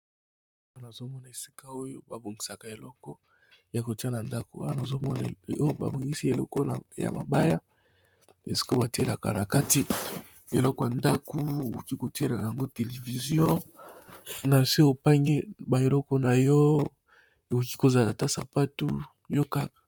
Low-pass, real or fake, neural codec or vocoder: 19.8 kHz; fake; vocoder, 44.1 kHz, 128 mel bands, Pupu-Vocoder